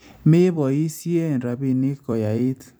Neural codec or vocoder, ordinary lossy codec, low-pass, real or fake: vocoder, 44.1 kHz, 128 mel bands every 256 samples, BigVGAN v2; none; none; fake